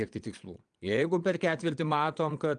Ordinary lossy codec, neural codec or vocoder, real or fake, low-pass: Opus, 32 kbps; vocoder, 22.05 kHz, 80 mel bands, Vocos; fake; 9.9 kHz